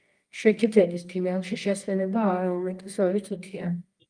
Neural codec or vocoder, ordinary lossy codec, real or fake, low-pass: codec, 24 kHz, 0.9 kbps, WavTokenizer, medium music audio release; Opus, 32 kbps; fake; 9.9 kHz